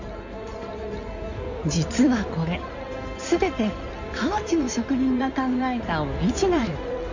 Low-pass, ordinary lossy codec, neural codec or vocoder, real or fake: 7.2 kHz; none; codec, 16 kHz in and 24 kHz out, 2.2 kbps, FireRedTTS-2 codec; fake